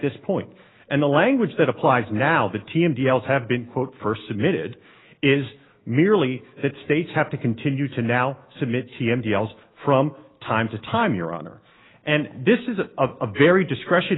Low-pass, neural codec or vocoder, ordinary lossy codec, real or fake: 7.2 kHz; autoencoder, 48 kHz, 128 numbers a frame, DAC-VAE, trained on Japanese speech; AAC, 16 kbps; fake